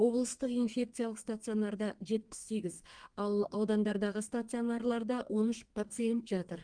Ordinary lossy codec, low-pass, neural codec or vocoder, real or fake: Opus, 32 kbps; 9.9 kHz; codec, 32 kHz, 1.9 kbps, SNAC; fake